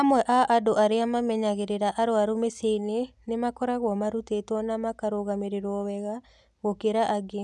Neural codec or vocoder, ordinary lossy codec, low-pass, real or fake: none; none; none; real